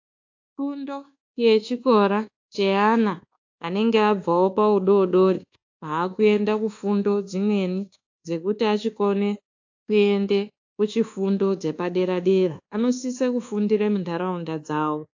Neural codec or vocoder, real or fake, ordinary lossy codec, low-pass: codec, 24 kHz, 1.2 kbps, DualCodec; fake; AAC, 48 kbps; 7.2 kHz